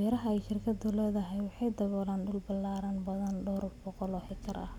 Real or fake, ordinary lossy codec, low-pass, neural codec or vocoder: real; none; 19.8 kHz; none